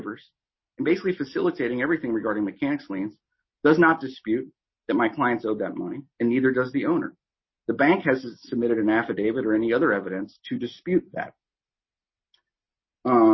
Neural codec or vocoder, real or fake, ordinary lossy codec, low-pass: none; real; MP3, 24 kbps; 7.2 kHz